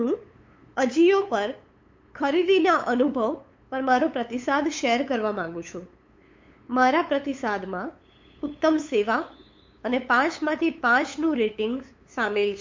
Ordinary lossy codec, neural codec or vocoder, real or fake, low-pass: MP3, 48 kbps; codec, 16 kHz, 8 kbps, FunCodec, trained on LibriTTS, 25 frames a second; fake; 7.2 kHz